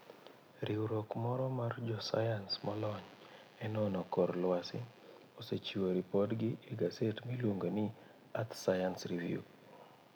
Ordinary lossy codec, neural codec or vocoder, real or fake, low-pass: none; none; real; none